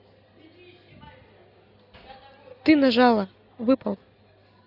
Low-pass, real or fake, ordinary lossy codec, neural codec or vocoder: 5.4 kHz; real; none; none